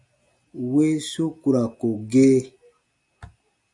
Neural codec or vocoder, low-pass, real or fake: none; 10.8 kHz; real